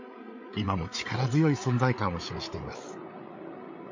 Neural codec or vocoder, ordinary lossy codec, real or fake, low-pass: codec, 16 kHz, 8 kbps, FreqCodec, larger model; MP3, 48 kbps; fake; 7.2 kHz